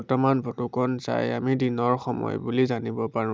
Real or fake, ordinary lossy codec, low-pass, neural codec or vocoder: real; none; none; none